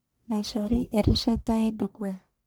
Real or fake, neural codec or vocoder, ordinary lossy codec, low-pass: fake; codec, 44.1 kHz, 1.7 kbps, Pupu-Codec; none; none